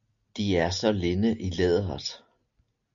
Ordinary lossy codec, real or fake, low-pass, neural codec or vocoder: MP3, 64 kbps; real; 7.2 kHz; none